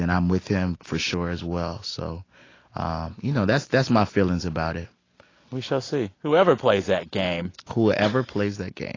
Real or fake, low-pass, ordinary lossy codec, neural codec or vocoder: real; 7.2 kHz; AAC, 32 kbps; none